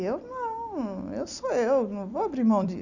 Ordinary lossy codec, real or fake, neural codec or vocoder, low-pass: none; fake; vocoder, 44.1 kHz, 128 mel bands every 256 samples, BigVGAN v2; 7.2 kHz